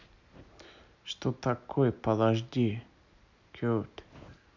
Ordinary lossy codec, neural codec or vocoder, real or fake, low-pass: MP3, 64 kbps; none; real; 7.2 kHz